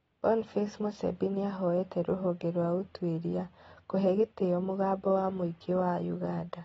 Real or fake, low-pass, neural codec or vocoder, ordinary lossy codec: real; 19.8 kHz; none; AAC, 24 kbps